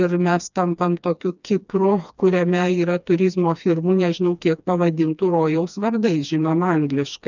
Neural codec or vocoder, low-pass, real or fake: codec, 16 kHz, 2 kbps, FreqCodec, smaller model; 7.2 kHz; fake